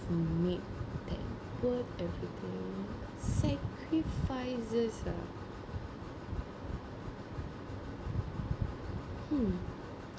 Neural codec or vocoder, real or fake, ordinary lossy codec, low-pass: none; real; none; none